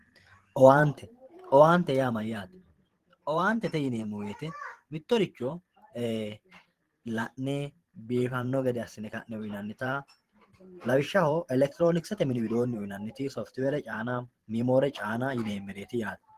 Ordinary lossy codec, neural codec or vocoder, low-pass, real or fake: Opus, 16 kbps; vocoder, 44.1 kHz, 128 mel bands every 512 samples, BigVGAN v2; 14.4 kHz; fake